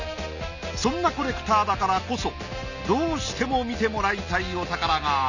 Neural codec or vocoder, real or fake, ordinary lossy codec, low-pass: none; real; none; 7.2 kHz